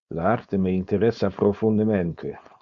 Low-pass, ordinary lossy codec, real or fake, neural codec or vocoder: 7.2 kHz; Opus, 64 kbps; fake; codec, 16 kHz, 4.8 kbps, FACodec